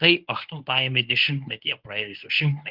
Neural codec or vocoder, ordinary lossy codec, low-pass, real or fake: codec, 24 kHz, 0.9 kbps, WavTokenizer, medium speech release version 1; Opus, 24 kbps; 5.4 kHz; fake